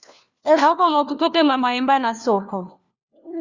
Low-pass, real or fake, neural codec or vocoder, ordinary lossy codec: 7.2 kHz; fake; codec, 16 kHz, 1 kbps, FunCodec, trained on LibriTTS, 50 frames a second; Opus, 64 kbps